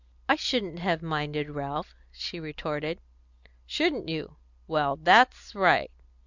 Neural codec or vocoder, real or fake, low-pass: none; real; 7.2 kHz